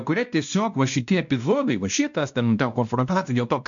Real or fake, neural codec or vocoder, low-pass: fake; codec, 16 kHz, 1 kbps, X-Codec, WavLM features, trained on Multilingual LibriSpeech; 7.2 kHz